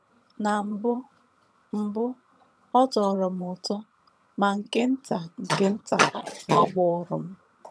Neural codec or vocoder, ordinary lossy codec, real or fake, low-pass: vocoder, 22.05 kHz, 80 mel bands, HiFi-GAN; none; fake; none